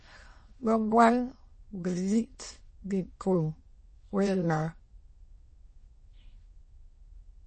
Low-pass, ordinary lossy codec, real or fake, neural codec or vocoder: 9.9 kHz; MP3, 32 kbps; fake; autoencoder, 22.05 kHz, a latent of 192 numbers a frame, VITS, trained on many speakers